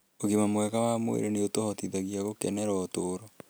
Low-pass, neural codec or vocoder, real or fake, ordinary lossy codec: none; none; real; none